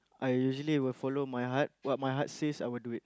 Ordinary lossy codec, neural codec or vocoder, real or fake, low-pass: none; none; real; none